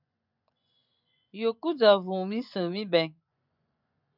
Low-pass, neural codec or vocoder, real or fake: 5.4 kHz; vocoder, 24 kHz, 100 mel bands, Vocos; fake